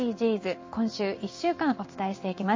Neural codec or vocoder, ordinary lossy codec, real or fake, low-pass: codec, 16 kHz in and 24 kHz out, 1 kbps, XY-Tokenizer; MP3, 32 kbps; fake; 7.2 kHz